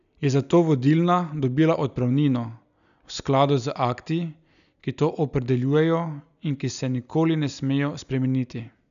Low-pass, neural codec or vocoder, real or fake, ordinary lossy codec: 7.2 kHz; none; real; none